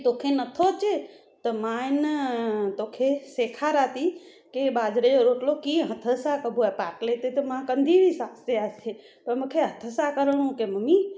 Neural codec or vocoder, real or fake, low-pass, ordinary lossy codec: none; real; none; none